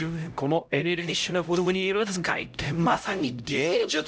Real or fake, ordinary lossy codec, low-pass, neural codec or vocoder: fake; none; none; codec, 16 kHz, 0.5 kbps, X-Codec, HuBERT features, trained on LibriSpeech